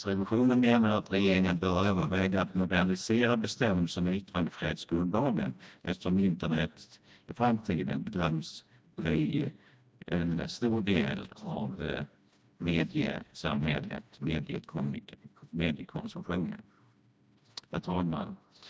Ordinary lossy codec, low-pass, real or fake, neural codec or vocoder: none; none; fake; codec, 16 kHz, 1 kbps, FreqCodec, smaller model